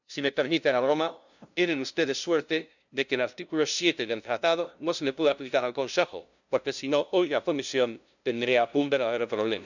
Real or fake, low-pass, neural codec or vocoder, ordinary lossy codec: fake; 7.2 kHz; codec, 16 kHz, 0.5 kbps, FunCodec, trained on LibriTTS, 25 frames a second; none